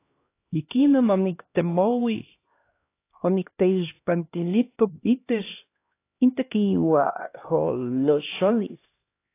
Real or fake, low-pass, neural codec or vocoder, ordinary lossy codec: fake; 3.6 kHz; codec, 16 kHz, 1 kbps, X-Codec, HuBERT features, trained on LibriSpeech; AAC, 24 kbps